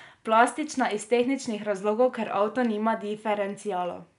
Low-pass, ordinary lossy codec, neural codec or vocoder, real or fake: 10.8 kHz; none; none; real